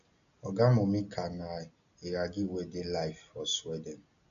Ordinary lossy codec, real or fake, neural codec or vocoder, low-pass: none; real; none; 7.2 kHz